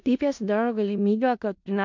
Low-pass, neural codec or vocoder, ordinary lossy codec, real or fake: 7.2 kHz; codec, 16 kHz in and 24 kHz out, 0.4 kbps, LongCat-Audio-Codec, four codebook decoder; MP3, 64 kbps; fake